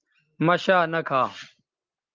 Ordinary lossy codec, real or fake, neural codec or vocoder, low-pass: Opus, 24 kbps; real; none; 7.2 kHz